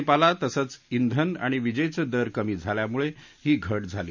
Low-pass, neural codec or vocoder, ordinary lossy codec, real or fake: 7.2 kHz; none; none; real